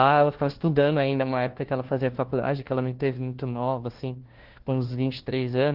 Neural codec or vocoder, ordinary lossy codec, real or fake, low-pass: codec, 16 kHz, 1 kbps, FunCodec, trained on LibriTTS, 50 frames a second; Opus, 16 kbps; fake; 5.4 kHz